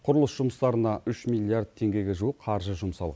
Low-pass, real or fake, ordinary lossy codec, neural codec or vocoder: none; real; none; none